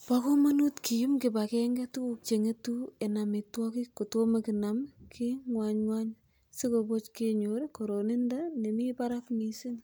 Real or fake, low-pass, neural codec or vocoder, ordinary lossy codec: real; none; none; none